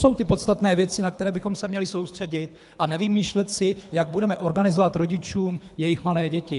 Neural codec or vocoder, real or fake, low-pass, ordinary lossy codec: codec, 24 kHz, 3 kbps, HILCodec; fake; 10.8 kHz; AAC, 96 kbps